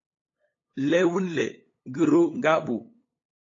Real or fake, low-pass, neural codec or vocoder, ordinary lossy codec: fake; 7.2 kHz; codec, 16 kHz, 8 kbps, FunCodec, trained on LibriTTS, 25 frames a second; AAC, 32 kbps